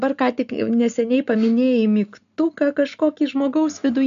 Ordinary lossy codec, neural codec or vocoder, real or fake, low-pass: AAC, 96 kbps; none; real; 7.2 kHz